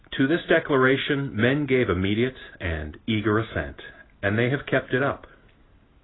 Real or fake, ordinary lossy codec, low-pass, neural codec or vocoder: real; AAC, 16 kbps; 7.2 kHz; none